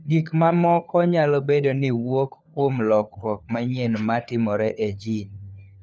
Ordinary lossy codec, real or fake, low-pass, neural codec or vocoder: none; fake; none; codec, 16 kHz, 4 kbps, FunCodec, trained on LibriTTS, 50 frames a second